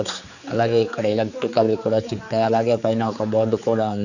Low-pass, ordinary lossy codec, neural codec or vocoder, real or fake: 7.2 kHz; none; codec, 16 kHz, 4 kbps, X-Codec, HuBERT features, trained on general audio; fake